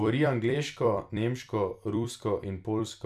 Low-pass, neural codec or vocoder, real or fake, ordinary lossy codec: 14.4 kHz; vocoder, 44.1 kHz, 128 mel bands every 256 samples, BigVGAN v2; fake; none